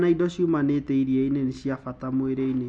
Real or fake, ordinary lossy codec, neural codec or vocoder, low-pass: real; none; none; 9.9 kHz